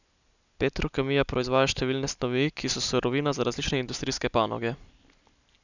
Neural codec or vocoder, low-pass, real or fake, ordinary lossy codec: none; 7.2 kHz; real; none